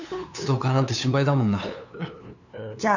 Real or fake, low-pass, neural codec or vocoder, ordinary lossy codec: fake; 7.2 kHz; codec, 16 kHz, 2 kbps, FunCodec, trained on LibriTTS, 25 frames a second; none